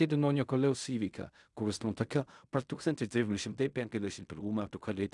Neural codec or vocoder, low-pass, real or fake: codec, 16 kHz in and 24 kHz out, 0.4 kbps, LongCat-Audio-Codec, fine tuned four codebook decoder; 10.8 kHz; fake